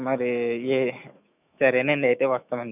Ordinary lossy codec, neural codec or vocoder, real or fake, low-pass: none; autoencoder, 48 kHz, 128 numbers a frame, DAC-VAE, trained on Japanese speech; fake; 3.6 kHz